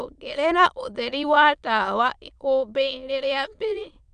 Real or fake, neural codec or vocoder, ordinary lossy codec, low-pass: fake; autoencoder, 22.05 kHz, a latent of 192 numbers a frame, VITS, trained on many speakers; none; 9.9 kHz